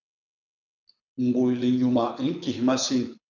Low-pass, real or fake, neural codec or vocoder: 7.2 kHz; fake; codec, 24 kHz, 6 kbps, HILCodec